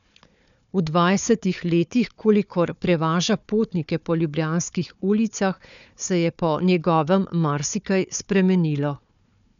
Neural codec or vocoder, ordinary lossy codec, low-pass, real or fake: codec, 16 kHz, 4 kbps, FunCodec, trained on Chinese and English, 50 frames a second; none; 7.2 kHz; fake